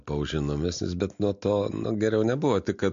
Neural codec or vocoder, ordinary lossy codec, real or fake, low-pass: none; MP3, 48 kbps; real; 7.2 kHz